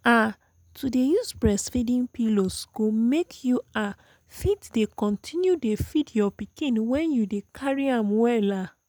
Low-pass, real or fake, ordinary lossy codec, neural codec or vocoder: none; real; none; none